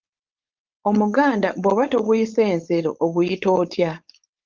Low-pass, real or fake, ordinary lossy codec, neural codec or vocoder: 7.2 kHz; fake; Opus, 32 kbps; codec, 16 kHz, 4.8 kbps, FACodec